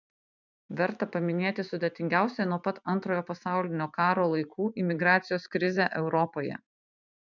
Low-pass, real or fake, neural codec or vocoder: 7.2 kHz; fake; vocoder, 44.1 kHz, 128 mel bands every 512 samples, BigVGAN v2